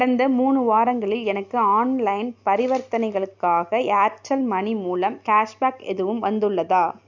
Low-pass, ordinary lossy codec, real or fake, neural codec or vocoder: 7.2 kHz; none; real; none